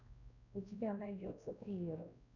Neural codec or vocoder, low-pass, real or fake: codec, 16 kHz, 1 kbps, X-Codec, WavLM features, trained on Multilingual LibriSpeech; 7.2 kHz; fake